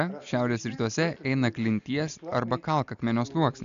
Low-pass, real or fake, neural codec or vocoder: 7.2 kHz; real; none